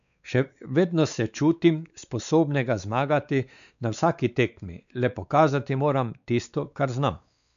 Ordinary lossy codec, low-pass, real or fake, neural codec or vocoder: none; 7.2 kHz; fake; codec, 16 kHz, 4 kbps, X-Codec, WavLM features, trained on Multilingual LibriSpeech